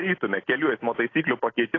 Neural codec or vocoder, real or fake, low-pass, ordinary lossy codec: none; real; 7.2 kHz; AAC, 32 kbps